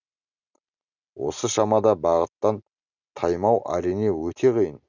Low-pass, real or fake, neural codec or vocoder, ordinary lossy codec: 7.2 kHz; real; none; none